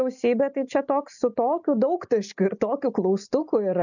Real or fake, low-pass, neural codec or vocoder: real; 7.2 kHz; none